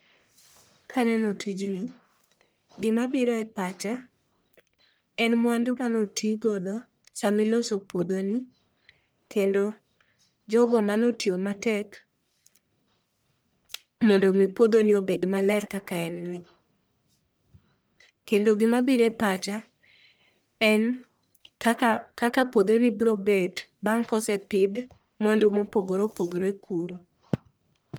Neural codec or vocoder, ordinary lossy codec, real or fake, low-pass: codec, 44.1 kHz, 1.7 kbps, Pupu-Codec; none; fake; none